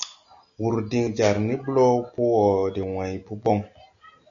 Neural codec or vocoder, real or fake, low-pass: none; real; 7.2 kHz